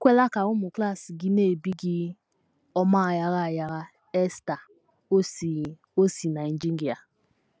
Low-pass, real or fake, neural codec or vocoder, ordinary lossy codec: none; real; none; none